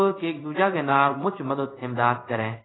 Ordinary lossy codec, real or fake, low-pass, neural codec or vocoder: AAC, 16 kbps; fake; 7.2 kHz; codec, 16 kHz in and 24 kHz out, 1 kbps, XY-Tokenizer